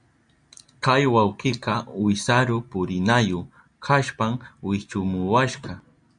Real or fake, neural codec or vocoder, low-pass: real; none; 9.9 kHz